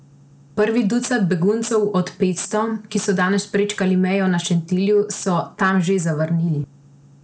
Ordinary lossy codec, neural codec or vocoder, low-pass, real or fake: none; none; none; real